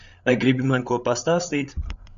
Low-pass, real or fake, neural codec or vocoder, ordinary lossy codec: 7.2 kHz; fake; codec, 16 kHz, 8 kbps, FreqCodec, larger model; AAC, 96 kbps